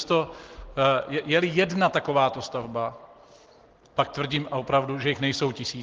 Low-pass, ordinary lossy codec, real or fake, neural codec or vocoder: 7.2 kHz; Opus, 16 kbps; real; none